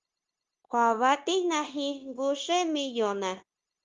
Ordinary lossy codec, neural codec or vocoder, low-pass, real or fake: Opus, 32 kbps; codec, 16 kHz, 0.9 kbps, LongCat-Audio-Codec; 7.2 kHz; fake